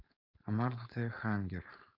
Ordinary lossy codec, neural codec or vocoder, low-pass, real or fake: none; codec, 16 kHz, 4.8 kbps, FACodec; 5.4 kHz; fake